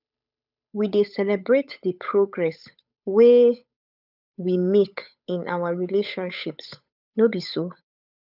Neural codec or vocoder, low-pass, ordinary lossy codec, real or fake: codec, 16 kHz, 8 kbps, FunCodec, trained on Chinese and English, 25 frames a second; 5.4 kHz; none; fake